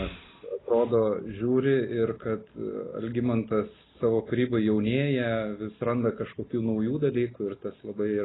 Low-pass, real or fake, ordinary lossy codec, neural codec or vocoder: 7.2 kHz; real; AAC, 16 kbps; none